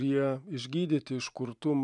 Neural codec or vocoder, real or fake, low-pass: vocoder, 44.1 kHz, 128 mel bands every 512 samples, BigVGAN v2; fake; 10.8 kHz